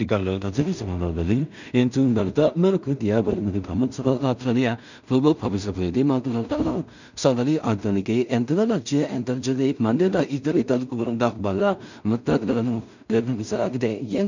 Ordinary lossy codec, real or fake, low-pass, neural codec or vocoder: none; fake; 7.2 kHz; codec, 16 kHz in and 24 kHz out, 0.4 kbps, LongCat-Audio-Codec, two codebook decoder